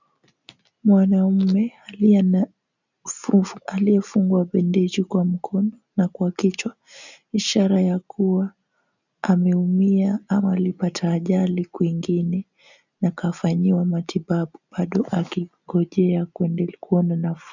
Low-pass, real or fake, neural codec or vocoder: 7.2 kHz; real; none